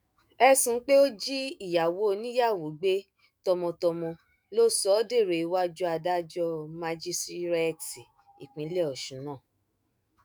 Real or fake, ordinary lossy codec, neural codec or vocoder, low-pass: fake; none; autoencoder, 48 kHz, 128 numbers a frame, DAC-VAE, trained on Japanese speech; none